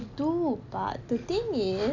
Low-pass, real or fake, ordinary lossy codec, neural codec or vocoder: 7.2 kHz; real; none; none